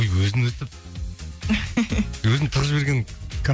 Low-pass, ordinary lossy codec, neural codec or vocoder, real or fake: none; none; none; real